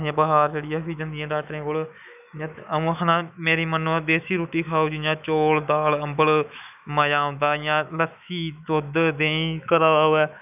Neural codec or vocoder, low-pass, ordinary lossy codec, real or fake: autoencoder, 48 kHz, 128 numbers a frame, DAC-VAE, trained on Japanese speech; 3.6 kHz; none; fake